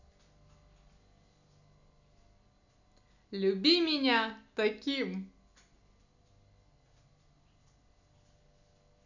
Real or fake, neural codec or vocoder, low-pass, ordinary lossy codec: real; none; 7.2 kHz; none